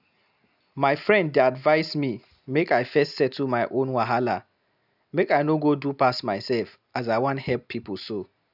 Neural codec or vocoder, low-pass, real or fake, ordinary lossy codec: none; 5.4 kHz; real; none